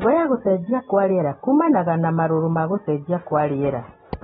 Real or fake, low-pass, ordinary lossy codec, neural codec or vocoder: real; 19.8 kHz; AAC, 16 kbps; none